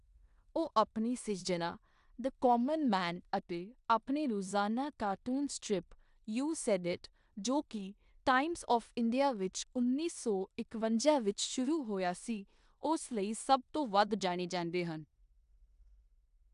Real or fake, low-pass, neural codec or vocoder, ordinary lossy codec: fake; 10.8 kHz; codec, 16 kHz in and 24 kHz out, 0.9 kbps, LongCat-Audio-Codec, four codebook decoder; AAC, 96 kbps